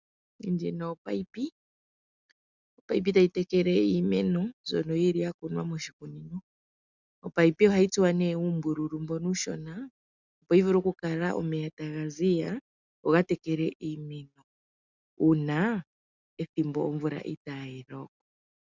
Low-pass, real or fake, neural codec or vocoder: 7.2 kHz; real; none